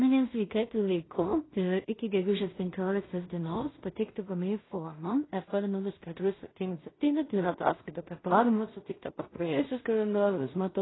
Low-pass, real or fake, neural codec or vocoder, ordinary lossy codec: 7.2 kHz; fake; codec, 16 kHz in and 24 kHz out, 0.4 kbps, LongCat-Audio-Codec, two codebook decoder; AAC, 16 kbps